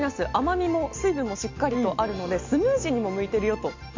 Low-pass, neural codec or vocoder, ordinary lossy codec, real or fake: 7.2 kHz; none; MP3, 48 kbps; real